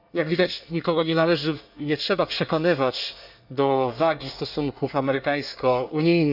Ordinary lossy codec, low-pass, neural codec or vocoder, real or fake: none; 5.4 kHz; codec, 24 kHz, 1 kbps, SNAC; fake